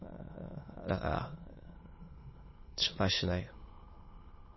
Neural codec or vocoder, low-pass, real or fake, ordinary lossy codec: autoencoder, 22.05 kHz, a latent of 192 numbers a frame, VITS, trained on many speakers; 7.2 kHz; fake; MP3, 24 kbps